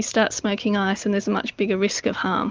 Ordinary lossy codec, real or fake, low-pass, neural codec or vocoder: Opus, 24 kbps; real; 7.2 kHz; none